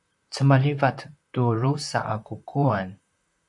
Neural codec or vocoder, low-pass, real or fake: vocoder, 44.1 kHz, 128 mel bands, Pupu-Vocoder; 10.8 kHz; fake